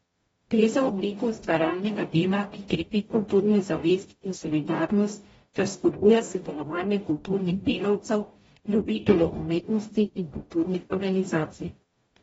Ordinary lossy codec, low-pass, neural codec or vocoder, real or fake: AAC, 24 kbps; 19.8 kHz; codec, 44.1 kHz, 0.9 kbps, DAC; fake